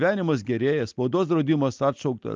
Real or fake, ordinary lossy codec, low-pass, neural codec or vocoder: real; Opus, 32 kbps; 7.2 kHz; none